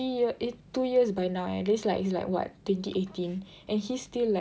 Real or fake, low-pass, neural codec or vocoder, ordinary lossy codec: real; none; none; none